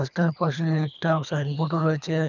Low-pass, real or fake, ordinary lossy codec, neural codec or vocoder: 7.2 kHz; fake; none; codec, 24 kHz, 3 kbps, HILCodec